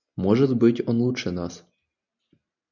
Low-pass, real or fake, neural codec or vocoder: 7.2 kHz; real; none